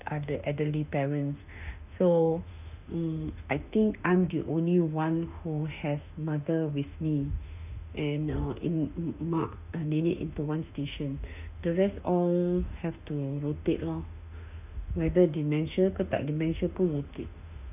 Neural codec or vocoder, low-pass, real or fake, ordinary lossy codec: autoencoder, 48 kHz, 32 numbers a frame, DAC-VAE, trained on Japanese speech; 3.6 kHz; fake; none